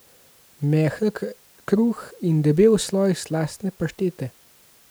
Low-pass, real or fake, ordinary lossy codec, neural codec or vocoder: none; real; none; none